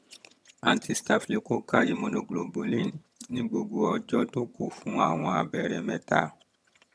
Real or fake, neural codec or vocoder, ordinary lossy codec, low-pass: fake; vocoder, 22.05 kHz, 80 mel bands, HiFi-GAN; none; none